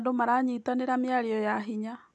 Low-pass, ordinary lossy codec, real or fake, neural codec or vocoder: none; none; real; none